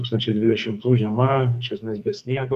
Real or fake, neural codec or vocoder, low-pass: fake; codec, 44.1 kHz, 2.6 kbps, SNAC; 14.4 kHz